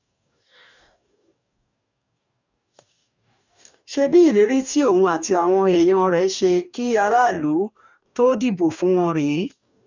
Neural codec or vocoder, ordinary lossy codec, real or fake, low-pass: codec, 44.1 kHz, 2.6 kbps, DAC; none; fake; 7.2 kHz